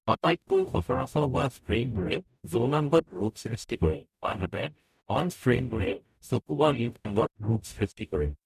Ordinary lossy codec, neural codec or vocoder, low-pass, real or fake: none; codec, 44.1 kHz, 0.9 kbps, DAC; 14.4 kHz; fake